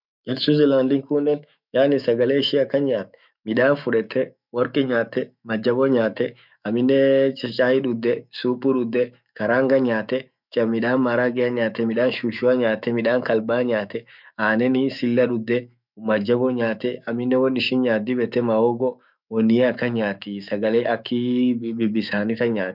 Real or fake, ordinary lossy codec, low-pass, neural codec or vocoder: fake; none; 5.4 kHz; autoencoder, 48 kHz, 128 numbers a frame, DAC-VAE, trained on Japanese speech